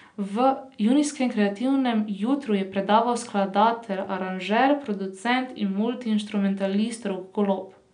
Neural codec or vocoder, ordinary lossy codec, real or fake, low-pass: none; none; real; 9.9 kHz